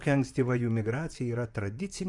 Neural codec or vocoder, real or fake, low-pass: vocoder, 44.1 kHz, 128 mel bands, Pupu-Vocoder; fake; 10.8 kHz